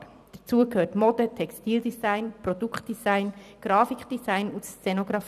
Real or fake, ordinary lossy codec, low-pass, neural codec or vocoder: fake; none; 14.4 kHz; vocoder, 44.1 kHz, 128 mel bands every 256 samples, BigVGAN v2